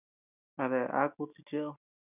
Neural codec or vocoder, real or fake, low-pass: none; real; 3.6 kHz